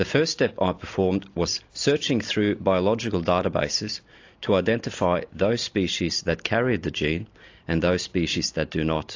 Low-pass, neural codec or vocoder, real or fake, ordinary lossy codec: 7.2 kHz; none; real; AAC, 48 kbps